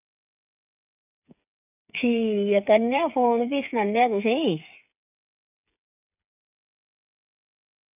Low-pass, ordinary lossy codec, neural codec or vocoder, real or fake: 3.6 kHz; none; codec, 16 kHz, 8 kbps, FreqCodec, smaller model; fake